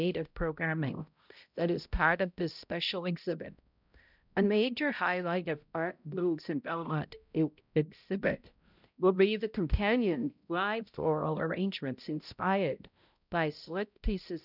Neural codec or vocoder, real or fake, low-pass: codec, 16 kHz, 0.5 kbps, X-Codec, HuBERT features, trained on balanced general audio; fake; 5.4 kHz